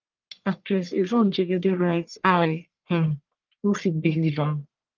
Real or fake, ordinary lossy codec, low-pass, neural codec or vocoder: fake; Opus, 32 kbps; 7.2 kHz; codec, 44.1 kHz, 1.7 kbps, Pupu-Codec